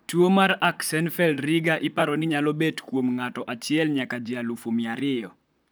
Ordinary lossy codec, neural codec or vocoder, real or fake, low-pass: none; vocoder, 44.1 kHz, 128 mel bands, Pupu-Vocoder; fake; none